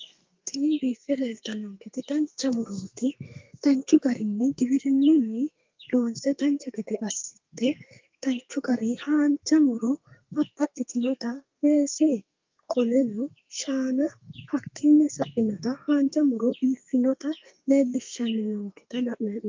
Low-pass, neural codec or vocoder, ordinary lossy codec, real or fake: 7.2 kHz; codec, 32 kHz, 1.9 kbps, SNAC; Opus, 24 kbps; fake